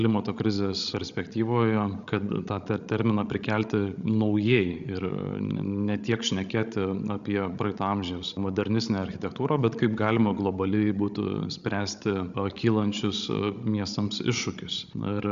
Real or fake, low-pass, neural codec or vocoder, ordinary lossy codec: fake; 7.2 kHz; codec, 16 kHz, 16 kbps, FreqCodec, larger model; Opus, 64 kbps